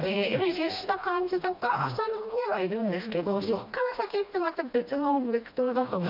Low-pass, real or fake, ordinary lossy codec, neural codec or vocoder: 5.4 kHz; fake; MP3, 48 kbps; codec, 16 kHz, 1 kbps, FreqCodec, smaller model